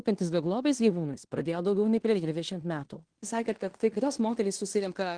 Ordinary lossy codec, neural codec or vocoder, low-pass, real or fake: Opus, 16 kbps; codec, 16 kHz in and 24 kHz out, 0.9 kbps, LongCat-Audio-Codec, fine tuned four codebook decoder; 9.9 kHz; fake